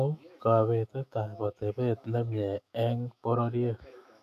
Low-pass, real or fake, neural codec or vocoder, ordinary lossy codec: 14.4 kHz; fake; autoencoder, 48 kHz, 128 numbers a frame, DAC-VAE, trained on Japanese speech; none